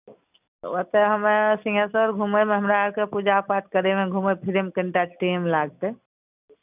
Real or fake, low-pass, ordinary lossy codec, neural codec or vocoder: real; 3.6 kHz; none; none